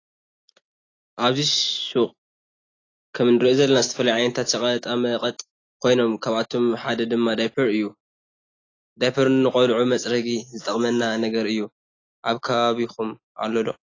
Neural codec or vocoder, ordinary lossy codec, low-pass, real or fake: none; AAC, 32 kbps; 7.2 kHz; real